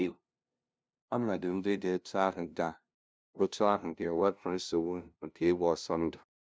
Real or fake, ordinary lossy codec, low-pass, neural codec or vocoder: fake; none; none; codec, 16 kHz, 0.5 kbps, FunCodec, trained on LibriTTS, 25 frames a second